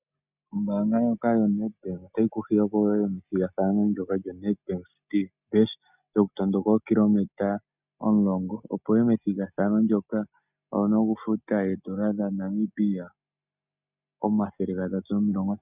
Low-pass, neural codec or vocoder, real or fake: 3.6 kHz; none; real